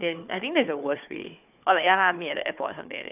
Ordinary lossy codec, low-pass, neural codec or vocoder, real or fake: none; 3.6 kHz; codec, 16 kHz, 4 kbps, FunCodec, trained on Chinese and English, 50 frames a second; fake